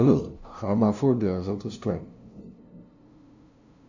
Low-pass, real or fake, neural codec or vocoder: 7.2 kHz; fake; codec, 16 kHz, 0.5 kbps, FunCodec, trained on LibriTTS, 25 frames a second